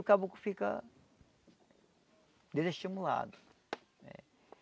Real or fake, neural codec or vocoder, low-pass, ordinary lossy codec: real; none; none; none